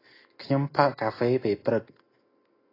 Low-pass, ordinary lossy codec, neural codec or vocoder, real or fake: 5.4 kHz; AAC, 24 kbps; none; real